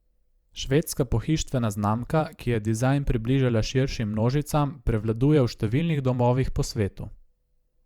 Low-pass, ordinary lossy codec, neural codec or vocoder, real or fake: 19.8 kHz; Opus, 64 kbps; vocoder, 44.1 kHz, 128 mel bands every 512 samples, BigVGAN v2; fake